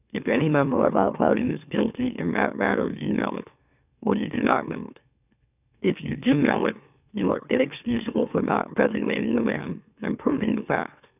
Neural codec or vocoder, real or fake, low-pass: autoencoder, 44.1 kHz, a latent of 192 numbers a frame, MeloTTS; fake; 3.6 kHz